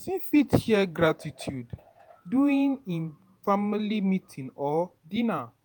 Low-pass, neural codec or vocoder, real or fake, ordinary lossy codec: none; vocoder, 48 kHz, 128 mel bands, Vocos; fake; none